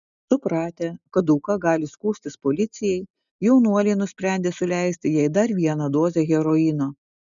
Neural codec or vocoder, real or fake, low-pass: none; real; 7.2 kHz